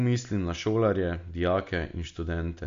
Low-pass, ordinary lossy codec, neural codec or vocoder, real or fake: 7.2 kHz; MP3, 48 kbps; none; real